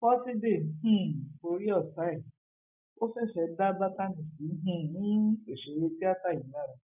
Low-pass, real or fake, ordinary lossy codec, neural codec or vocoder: 3.6 kHz; real; none; none